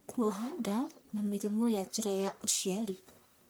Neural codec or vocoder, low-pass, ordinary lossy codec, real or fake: codec, 44.1 kHz, 1.7 kbps, Pupu-Codec; none; none; fake